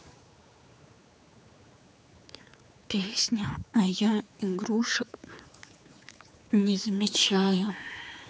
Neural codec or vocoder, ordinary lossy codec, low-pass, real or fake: codec, 16 kHz, 4 kbps, X-Codec, HuBERT features, trained on general audio; none; none; fake